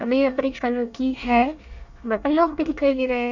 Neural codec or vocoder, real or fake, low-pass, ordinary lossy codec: codec, 24 kHz, 1 kbps, SNAC; fake; 7.2 kHz; none